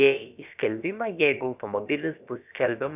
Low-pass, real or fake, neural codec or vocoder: 3.6 kHz; fake; codec, 16 kHz, about 1 kbps, DyCAST, with the encoder's durations